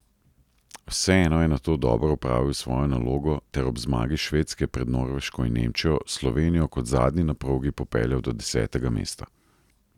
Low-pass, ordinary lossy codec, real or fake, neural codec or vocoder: 19.8 kHz; none; real; none